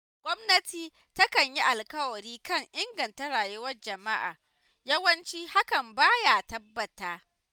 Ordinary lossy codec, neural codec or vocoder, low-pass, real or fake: none; none; none; real